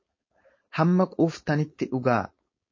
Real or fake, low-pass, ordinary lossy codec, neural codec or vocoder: fake; 7.2 kHz; MP3, 32 kbps; codec, 16 kHz, 4.8 kbps, FACodec